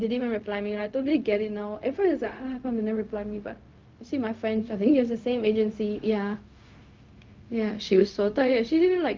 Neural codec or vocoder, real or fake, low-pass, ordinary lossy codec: codec, 16 kHz, 0.4 kbps, LongCat-Audio-Codec; fake; 7.2 kHz; Opus, 24 kbps